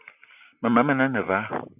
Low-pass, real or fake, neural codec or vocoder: 3.6 kHz; real; none